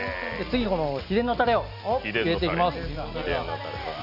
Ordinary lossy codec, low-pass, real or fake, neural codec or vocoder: MP3, 48 kbps; 5.4 kHz; real; none